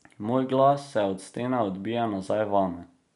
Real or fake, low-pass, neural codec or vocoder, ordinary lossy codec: real; 10.8 kHz; none; MP3, 64 kbps